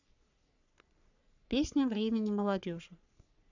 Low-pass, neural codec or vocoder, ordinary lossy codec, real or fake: 7.2 kHz; codec, 44.1 kHz, 3.4 kbps, Pupu-Codec; none; fake